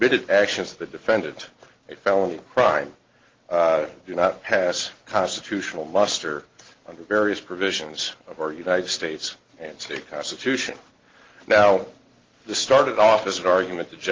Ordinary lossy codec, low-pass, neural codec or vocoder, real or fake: Opus, 16 kbps; 7.2 kHz; none; real